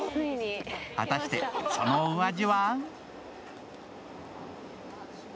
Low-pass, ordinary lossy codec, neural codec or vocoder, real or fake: none; none; none; real